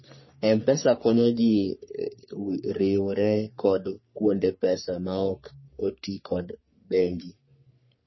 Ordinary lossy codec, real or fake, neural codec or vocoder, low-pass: MP3, 24 kbps; fake; codec, 44.1 kHz, 3.4 kbps, Pupu-Codec; 7.2 kHz